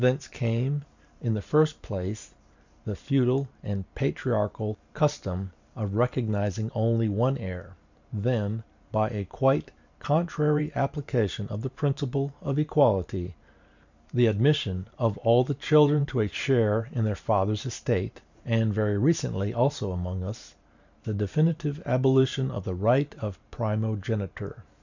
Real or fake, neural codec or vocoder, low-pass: fake; vocoder, 44.1 kHz, 128 mel bands every 512 samples, BigVGAN v2; 7.2 kHz